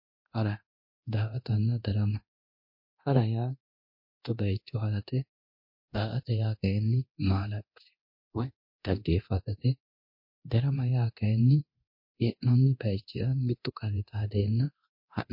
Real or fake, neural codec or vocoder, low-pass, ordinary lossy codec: fake; codec, 24 kHz, 0.9 kbps, DualCodec; 5.4 kHz; MP3, 32 kbps